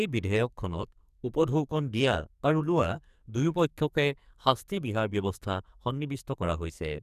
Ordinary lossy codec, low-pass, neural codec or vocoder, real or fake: none; 14.4 kHz; codec, 44.1 kHz, 2.6 kbps, SNAC; fake